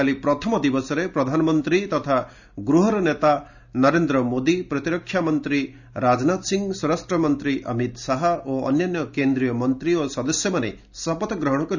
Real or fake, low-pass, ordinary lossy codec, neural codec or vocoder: real; 7.2 kHz; none; none